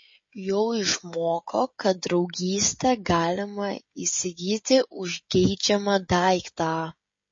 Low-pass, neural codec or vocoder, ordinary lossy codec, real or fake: 7.2 kHz; codec, 16 kHz, 16 kbps, FreqCodec, smaller model; MP3, 32 kbps; fake